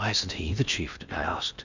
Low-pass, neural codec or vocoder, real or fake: 7.2 kHz; codec, 16 kHz in and 24 kHz out, 0.8 kbps, FocalCodec, streaming, 65536 codes; fake